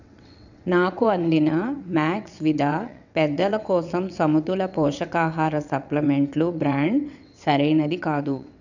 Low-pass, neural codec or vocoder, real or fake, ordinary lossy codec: 7.2 kHz; vocoder, 22.05 kHz, 80 mel bands, WaveNeXt; fake; none